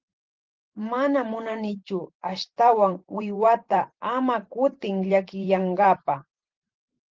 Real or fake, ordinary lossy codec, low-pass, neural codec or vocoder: fake; Opus, 16 kbps; 7.2 kHz; vocoder, 44.1 kHz, 128 mel bands every 512 samples, BigVGAN v2